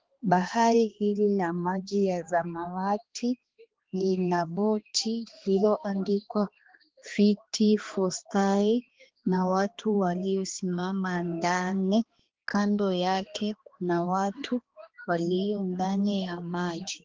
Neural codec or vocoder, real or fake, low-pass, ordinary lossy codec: codec, 16 kHz, 2 kbps, X-Codec, HuBERT features, trained on balanced general audio; fake; 7.2 kHz; Opus, 16 kbps